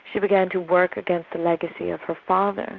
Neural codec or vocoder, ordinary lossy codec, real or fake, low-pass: none; Opus, 64 kbps; real; 7.2 kHz